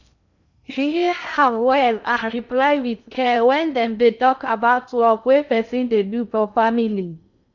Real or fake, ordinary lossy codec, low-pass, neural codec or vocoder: fake; none; 7.2 kHz; codec, 16 kHz in and 24 kHz out, 0.6 kbps, FocalCodec, streaming, 2048 codes